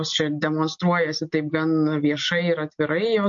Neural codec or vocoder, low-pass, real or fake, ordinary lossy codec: none; 7.2 kHz; real; MP3, 48 kbps